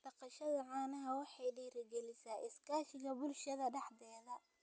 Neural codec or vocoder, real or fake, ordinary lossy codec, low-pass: none; real; none; none